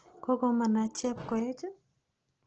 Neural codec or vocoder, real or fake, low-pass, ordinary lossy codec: none; real; 7.2 kHz; Opus, 16 kbps